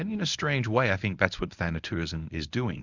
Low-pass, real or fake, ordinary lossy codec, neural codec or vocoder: 7.2 kHz; fake; Opus, 64 kbps; codec, 24 kHz, 0.9 kbps, WavTokenizer, medium speech release version 1